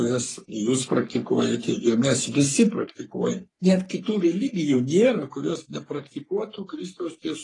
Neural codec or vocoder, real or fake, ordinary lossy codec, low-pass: codec, 44.1 kHz, 3.4 kbps, Pupu-Codec; fake; AAC, 32 kbps; 10.8 kHz